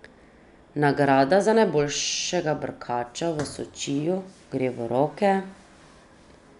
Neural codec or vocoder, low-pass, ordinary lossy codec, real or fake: none; 10.8 kHz; none; real